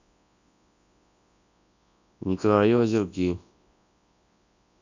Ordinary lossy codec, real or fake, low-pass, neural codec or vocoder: none; fake; 7.2 kHz; codec, 24 kHz, 0.9 kbps, WavTokenizer, large speech release